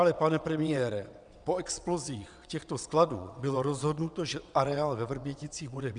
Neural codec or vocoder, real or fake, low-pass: vocoder, 22.05 kHz, 80 mel bands, WaveNeXt; fake; 9.9 kHz